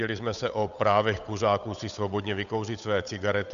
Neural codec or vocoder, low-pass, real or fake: codec, 16 kHz, 8 kbps, FunCodec, trained on Chinese and English, 25 frames a second; 7.2 kHz; fake